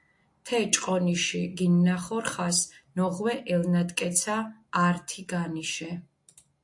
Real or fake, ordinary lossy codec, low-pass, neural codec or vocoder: real; AAC, 64 kbps; 10.8 kHz; none